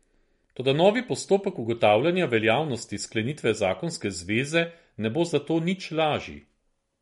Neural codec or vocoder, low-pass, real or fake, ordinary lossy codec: none; 14.4 kHz; real; MP3, 48 kbps